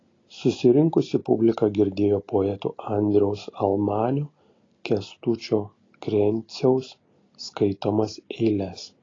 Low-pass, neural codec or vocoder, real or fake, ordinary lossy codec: 7.2 kHz; none; real; AAC, 32 kbps